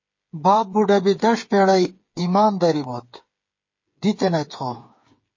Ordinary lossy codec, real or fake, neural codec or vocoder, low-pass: MP3, 32 kbps; fake; codec, 16 kHz, 8 kbps, FreqCodec, smaller model; 7.2 kHz